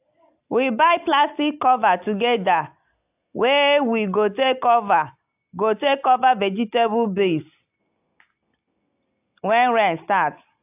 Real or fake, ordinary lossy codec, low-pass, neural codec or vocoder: real; AAC, 32 kbps; 3.6 kHz; none